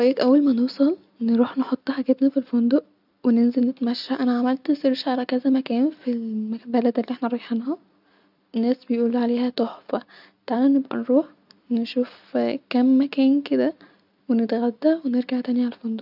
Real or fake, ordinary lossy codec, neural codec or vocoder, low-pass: real; none; none; 5.4 kHz